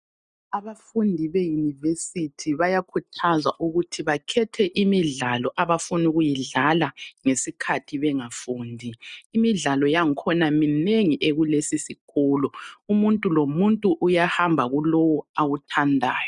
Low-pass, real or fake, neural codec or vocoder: 10.8 kHz; real; none